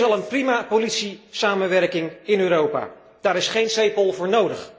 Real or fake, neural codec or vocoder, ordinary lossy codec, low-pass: real; none; none; none